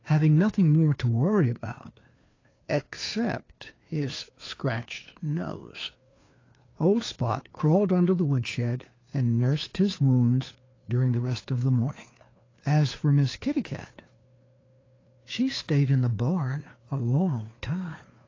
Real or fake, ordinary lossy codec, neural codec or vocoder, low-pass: fake; AAC, 32 kbps; codec, 16 kHz, 2 kbps, FunCodec, trained on Chinese and English, 25 frames a second; 7.2 kHz